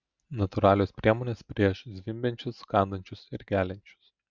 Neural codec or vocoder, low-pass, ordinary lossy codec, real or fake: none; 7.2 kHz; Opus, 64 kbps; real